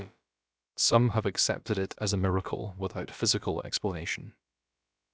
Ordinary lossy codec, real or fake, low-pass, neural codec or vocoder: none; fake; none; codec, 16 kHz, about 1 kbps, DyCAST, with the encoder's durations